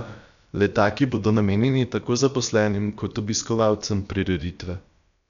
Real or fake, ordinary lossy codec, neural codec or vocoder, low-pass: fake; none; codec, 16 kHz, about 1 kbps, DyCAST, with the encoder's durations; 7.2 kHz